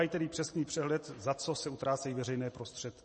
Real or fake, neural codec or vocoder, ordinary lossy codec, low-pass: fake; vocoder, 44.1 kHz, 128 mel bands every 512 samples, BigVGAN v2; MP3, 32 kbps; 10.8 kHz